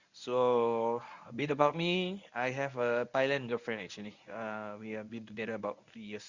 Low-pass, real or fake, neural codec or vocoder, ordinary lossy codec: 7.2 kHz; fake; codec, 24 kHz, 0.9 kbps, WavTokenizer, medium speech release version 1; Opus, 64 kbps